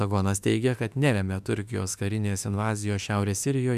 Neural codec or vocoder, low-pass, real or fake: autoencoder, 48 kHz, 32 numbers a frame, DAC-VAE, trained on Japanese speech; 14.4 kHz; fake